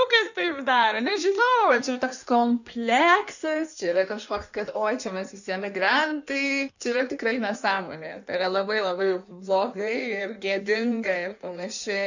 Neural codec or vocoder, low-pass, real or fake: codec, 16 kHz in and 24 kHz out, 1.1 kbps, FireRedTTS-2 codec; 7.2 kHz; fake